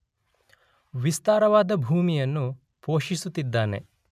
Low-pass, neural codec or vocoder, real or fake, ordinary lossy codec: 14.4 kHz; none; real; none